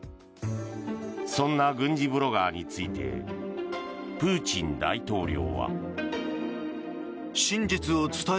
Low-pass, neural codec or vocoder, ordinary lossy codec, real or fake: none; none; none; real